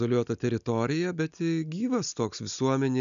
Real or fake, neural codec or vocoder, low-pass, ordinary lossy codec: real; none; 7.2 kHz; MP3, 96 kbps